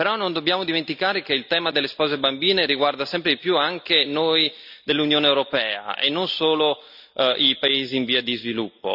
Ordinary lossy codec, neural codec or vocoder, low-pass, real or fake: none; none; 5.4 kHz; real